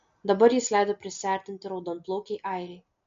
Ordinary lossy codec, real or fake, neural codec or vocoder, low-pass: MP3, 48 kbps; real; none; 7.2 kHz